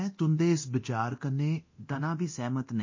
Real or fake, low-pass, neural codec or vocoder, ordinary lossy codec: fake; 7.2 kHz; codec, 24 kHz, 0.9 kbps, DualCodec; MP3, 32 kbps